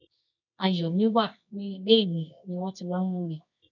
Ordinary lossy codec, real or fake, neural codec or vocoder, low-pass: none; fake; codec, 24 kHz, 0.9 kbps, WavTokenizer, medium music audio release; 7.2 kHz